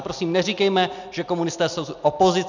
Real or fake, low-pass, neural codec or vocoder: real; 7.2 kHz; none